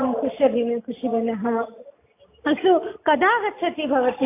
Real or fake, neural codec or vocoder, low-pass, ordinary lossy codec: real; none; 3.6 kHz; AAC, 24 kbps